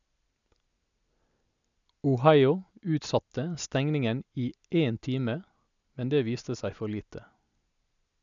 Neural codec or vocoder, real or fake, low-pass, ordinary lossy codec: none; real; 7.2 kHz; none